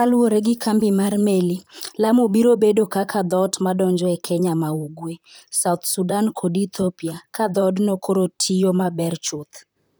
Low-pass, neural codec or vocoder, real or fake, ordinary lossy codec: none; vocoder, 44.1 kHz, 128 mel bands, Pupu-Vocoder; fake; none